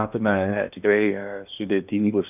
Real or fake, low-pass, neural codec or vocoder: fake; 3.6 kHz; codec, 16 kHz in and 24 kHz out, 0.8 kbps, FocalCodec, streaming, 65536 codes